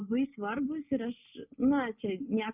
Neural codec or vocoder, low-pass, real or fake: none; 3.6 kHz; real